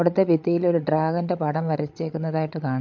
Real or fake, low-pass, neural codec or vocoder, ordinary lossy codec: fake; 7.2 kHz; codec, 16 kHz, 16 kbps, FreqCodec, larger model; MP3, 48 kbps